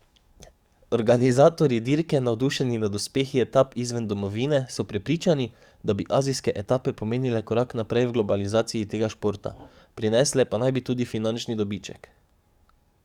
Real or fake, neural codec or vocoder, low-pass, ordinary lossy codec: fake; codec, 44.1 kHz, 7.8 kbps, DAC; 19.8 kHz; Opus, 64 kbps